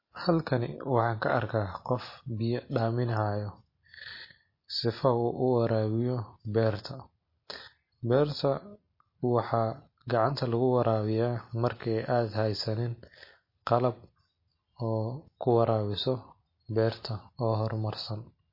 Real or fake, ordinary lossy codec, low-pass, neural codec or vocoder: real; MP3, 24 kbps; 5.4 kHz; none